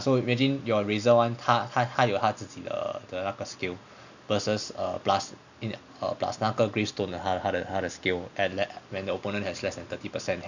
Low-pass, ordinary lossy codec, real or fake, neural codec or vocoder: 7.2 kHz; none; real; none